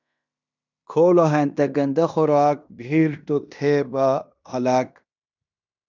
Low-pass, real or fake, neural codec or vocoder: 7.2 kHz; fake; codec, 16 kHz in and 24 kHz out, 0.9 kbps, LongCat-Audio-Codec, fine tuned four codebook decoder